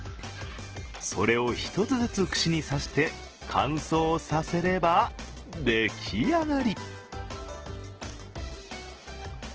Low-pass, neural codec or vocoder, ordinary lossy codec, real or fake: 7.2 kHz; none; Opus, 16 kbps; real